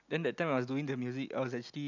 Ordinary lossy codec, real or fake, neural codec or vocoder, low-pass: none; real; none; 7.2 kHz